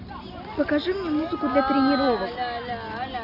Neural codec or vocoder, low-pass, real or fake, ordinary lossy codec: none; 5.4 kHz; real; none